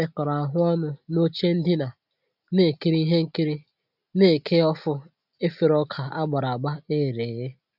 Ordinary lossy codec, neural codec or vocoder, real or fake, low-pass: none; none; real; 5.4 kHz